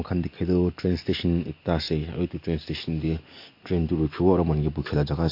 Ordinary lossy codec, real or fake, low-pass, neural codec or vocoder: MP3, 32 kbps; real; 5.4 kHz; none